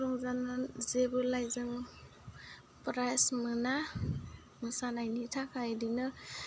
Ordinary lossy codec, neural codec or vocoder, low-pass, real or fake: none; none; none; real